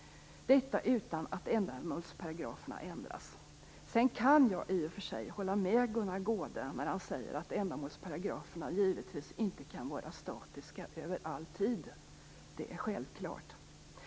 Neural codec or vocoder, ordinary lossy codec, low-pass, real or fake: none; none; none; real